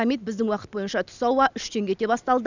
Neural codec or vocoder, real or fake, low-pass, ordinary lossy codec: none; real; 7.2 kHz; none